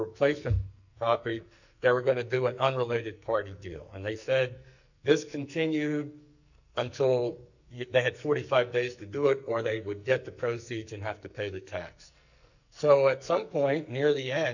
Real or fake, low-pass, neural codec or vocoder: fake; 7.2 kHz; codec, 44.1 kHz, 2.6 kbps, SNAC